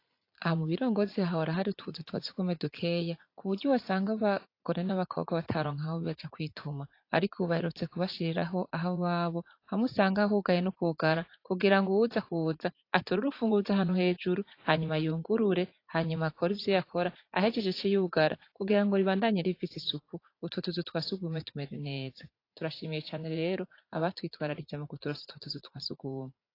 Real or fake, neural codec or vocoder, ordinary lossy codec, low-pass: fake; vocoder, 44.1 kHz, 80 mel bands, Vocos; AAC, 32 kbps; 5.4 kHz